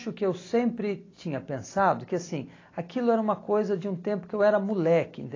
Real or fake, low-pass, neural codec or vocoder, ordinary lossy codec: real; 7.2 kHz; none; AAC, 32 kbps